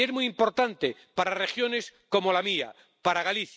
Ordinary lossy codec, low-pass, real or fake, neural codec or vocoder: none; none; real; none